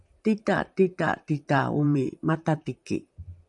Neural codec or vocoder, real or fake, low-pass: vocoder, 44.1 kHz, 128 mel bands, Pupu-Vocoder; fake; 10.8 kHz